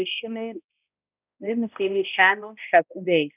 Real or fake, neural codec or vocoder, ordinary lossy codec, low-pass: fake; codec, 16 kHz, 0.5 kbps, X-Codec, HuBERT features, trained on balanced general audio; none; 3.6 kHz